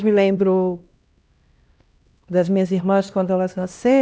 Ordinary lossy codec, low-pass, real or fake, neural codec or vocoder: none; none; fake; codec, 16 kHz, 1 kbps, X-Codec, HuBERT features, trained on LibriSpeech